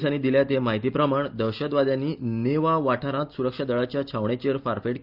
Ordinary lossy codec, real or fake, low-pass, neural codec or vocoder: Opus, 24 kbps; real; 5.4 kHz; none